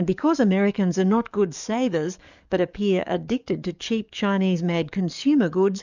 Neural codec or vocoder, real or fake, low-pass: codec, 44.1 kHz, 7.8 kbps, DAC; fake; 7.2 kHz